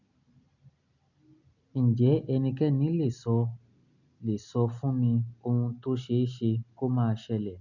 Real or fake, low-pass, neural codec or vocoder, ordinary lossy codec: real; 7.2 kHz; none; none